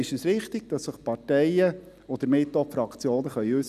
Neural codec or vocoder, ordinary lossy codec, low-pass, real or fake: none; none; 14.4 kHz; real